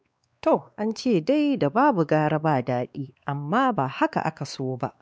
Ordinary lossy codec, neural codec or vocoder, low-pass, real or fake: none; codec, 16 kHz, 2 kbps, X-Codec, WavLM features, trained on Multilingual LibriSpeech; none; fake